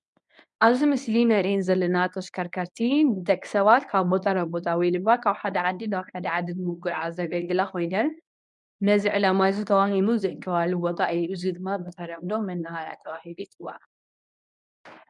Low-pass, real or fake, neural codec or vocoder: 10.8 kHz; fake; codec, 24 kHz, 0.9 kbps, WavTokenizer, medium speech release version 1